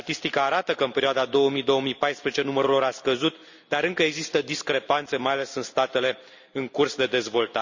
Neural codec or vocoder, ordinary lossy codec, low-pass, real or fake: none; Opus, 64 kbps; 7.2 kHz; real